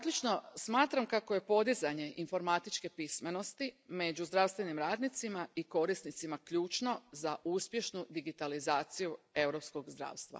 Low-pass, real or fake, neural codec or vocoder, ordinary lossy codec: none; real; none; none